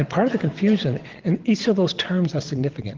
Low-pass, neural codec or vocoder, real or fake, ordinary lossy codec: 7.2 kHz; vocoder, 22.05 kHz, 80 mel bands, WaveNeXt; fake; Opus, 16 kbps